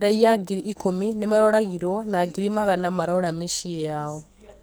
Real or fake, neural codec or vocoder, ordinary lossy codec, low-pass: fake; codec, 44.1 kHz, 2.6 kbps, SNAC; none; none